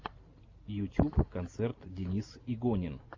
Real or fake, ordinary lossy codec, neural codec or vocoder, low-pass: real; AAC, 48 kbps; none; 7.2 kHz